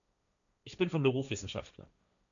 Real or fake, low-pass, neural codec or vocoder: fake; 7.2 kHz; codec, 16 kHz, 1.1 kbps, Voila-Tokenizer